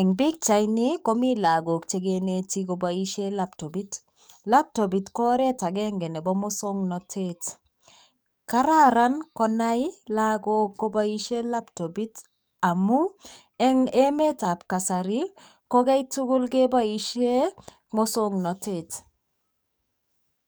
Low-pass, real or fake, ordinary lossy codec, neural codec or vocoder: none; fake; none; codec, 44.1 kHz, 7.8 kbps, DAC